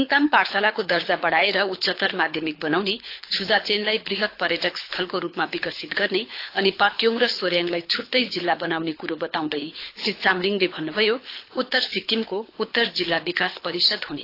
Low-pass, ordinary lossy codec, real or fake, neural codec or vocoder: 5.4 kHz; AAC, 32 kbps; fake; codec, 24 kHz, 6 kbps, HILCodec